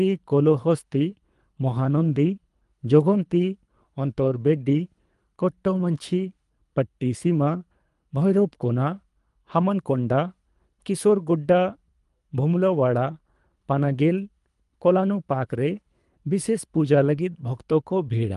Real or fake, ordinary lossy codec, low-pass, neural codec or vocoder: fake; Opus, 32 kbps; 10.8 kHz; codec, 24 kHz, 3 kbps, HILCodec